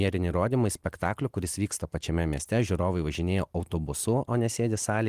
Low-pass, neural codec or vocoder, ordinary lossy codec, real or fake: 14.4 kHz; none; Opus, 24 kbps; real